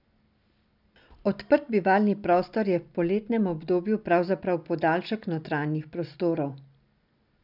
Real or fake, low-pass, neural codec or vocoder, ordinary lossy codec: real; 5.4 kHz; none; none